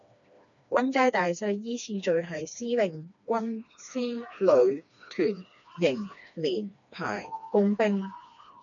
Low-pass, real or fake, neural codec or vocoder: 7.2 kHz; fake; codec, 16 kHz, 2 kbps, FreqCodec, smaller model